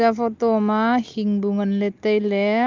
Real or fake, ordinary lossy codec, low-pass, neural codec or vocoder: real; Opus, 24 kbps; 7.2 kHz; none